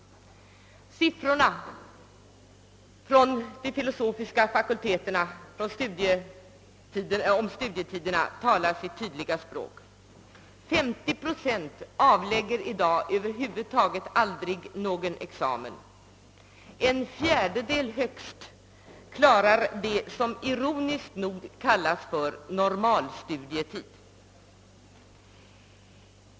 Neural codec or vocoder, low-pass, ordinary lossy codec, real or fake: none; none; none; real